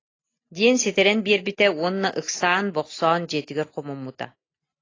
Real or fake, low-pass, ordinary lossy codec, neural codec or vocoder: real; 7.2 kHz; AAC, 32 kbps; none